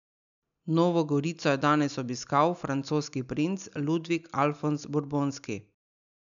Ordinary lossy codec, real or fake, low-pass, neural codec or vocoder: none; real; 7.2 kHz; none